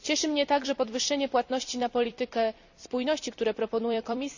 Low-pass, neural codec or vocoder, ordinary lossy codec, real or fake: 7.2 kHz; vocoder, 44.1 kHz, 128 mel bands every 256 samples, BigVGAN v2; none; fake